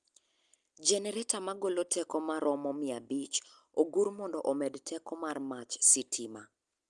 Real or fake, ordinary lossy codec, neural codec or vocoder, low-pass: real; Opus, 32 kbps; none; 10.8 kHz